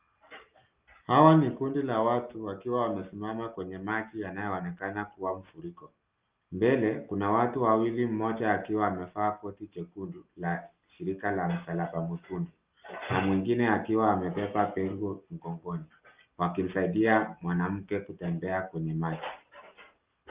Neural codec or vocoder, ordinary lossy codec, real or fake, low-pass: none; Opus, 32 kbps; real; 3.6 kHz